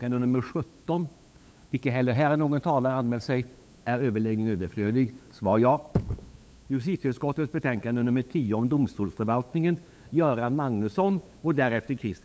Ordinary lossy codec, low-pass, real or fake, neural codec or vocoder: none; none; fake; codec, 16 kHz, 8 kbps, FunCodec, trained on LibriTTS, 25 frames a second